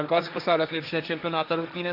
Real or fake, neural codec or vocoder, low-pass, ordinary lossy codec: fake; codec, 16 kHz, 1.1 kbps, Voila-Tokenizer; 5.4 kHz; AAC, 48 kbps